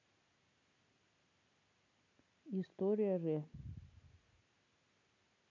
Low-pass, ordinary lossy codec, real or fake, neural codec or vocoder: 7.2 kHz; none; real; none